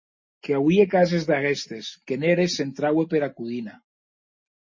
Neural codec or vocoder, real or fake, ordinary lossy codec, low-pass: none; real; MP3, 32 kbps; 7.2 kHz